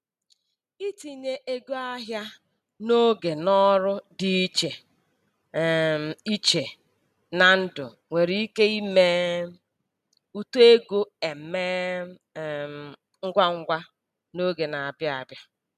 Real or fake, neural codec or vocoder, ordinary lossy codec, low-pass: real; none; none; 14.4 kHz